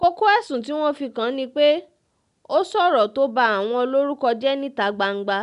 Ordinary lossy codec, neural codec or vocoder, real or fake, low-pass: none; none; real; 10.8 kHz